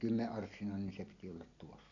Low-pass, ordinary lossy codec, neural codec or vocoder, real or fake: 7.2 kHz; none; none; real